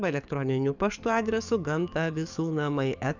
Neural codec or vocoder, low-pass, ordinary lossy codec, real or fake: codec, 44.1 kHz, 7.8 kbps, DAC; 7.2 kHz; Opus, 64 kbps; fake